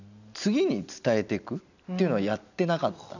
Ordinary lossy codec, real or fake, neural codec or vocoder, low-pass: none; real; none; 7.2 kHz